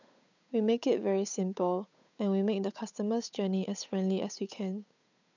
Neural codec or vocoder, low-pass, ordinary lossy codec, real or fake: codec, 16 kHz, 8 kbps, FunCodec, trained on Chinese and English, 25 frames a second; 7.2 kHz; none; fake